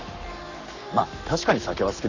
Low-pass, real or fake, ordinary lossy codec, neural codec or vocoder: 7.2 kHz; fake; none; codec, 44.1 kHz, 7.8 kbps, Pupu-Codec